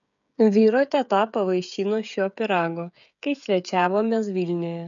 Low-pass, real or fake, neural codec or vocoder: 7.2 kHz; fake; codec, 16 kHz, 16 kbps, FreqCodec, smaller model